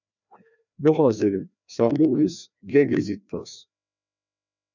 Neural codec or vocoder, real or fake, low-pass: codec, 16 kHz, 1 kbps, FreqCodec, larger model; fake; 7.2 kHz